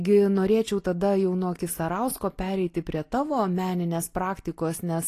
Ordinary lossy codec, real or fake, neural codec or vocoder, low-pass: AAC, 48 kbps; real; none; 14.4 kHz